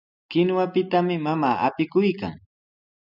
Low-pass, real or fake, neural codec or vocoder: 5.4 kHz; real; none